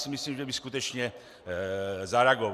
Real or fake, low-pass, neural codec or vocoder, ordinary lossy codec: real; 14.4 kHz; none; Opus, 64 kbps